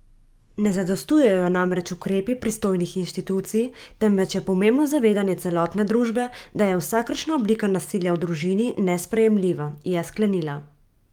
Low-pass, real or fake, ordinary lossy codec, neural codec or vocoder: 19.8 kHz; fake; Opus, 32 kbps; codec, 44.1 kHz, 7.8 kbps, DAC